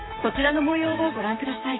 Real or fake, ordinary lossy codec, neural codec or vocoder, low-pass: fake; AAC, 16 kbps; vocoder, 44.1 kHz, 128 mel bands, Pupu-Vocoder; 7.2 kHz